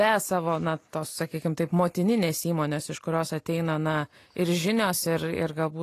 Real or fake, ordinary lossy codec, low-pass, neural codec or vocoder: real; AAC, 48 kbps; 14.4 kHz; none